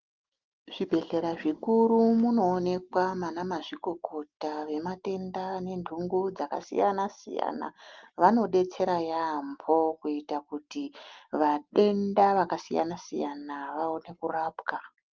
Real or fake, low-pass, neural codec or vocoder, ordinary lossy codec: real; 7.2 kHz; none; Opus, 32 kbps